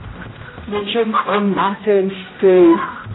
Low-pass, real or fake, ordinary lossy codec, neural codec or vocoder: 7.2 kHz; fake; AAC, 16 kbps; codec, 16 kHz, 0.5 kbps, X-Codec, HuBERT features, trained on general audio